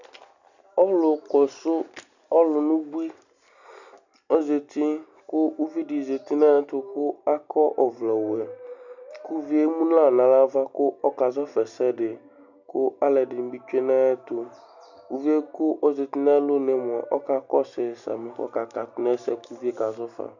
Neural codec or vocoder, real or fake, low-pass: none; real; 7.2 kHz